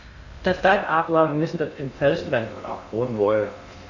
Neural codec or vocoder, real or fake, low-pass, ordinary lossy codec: codec, 16 kHz in and 24 kHz out, 0.6 kbps, FocalCodec, streaming, 2048 codes; fake; 7.2 kHz; Opus, 64 kbps